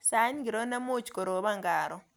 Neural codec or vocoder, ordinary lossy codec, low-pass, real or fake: none; none; none; real